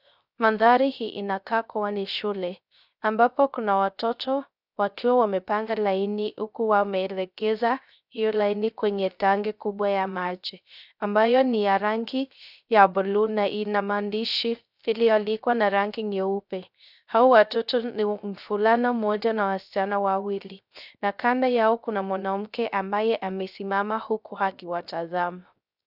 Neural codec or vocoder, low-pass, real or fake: codec, 16 kHz, 0.3 kbps, FocalCodec; 5.4 kHz; fake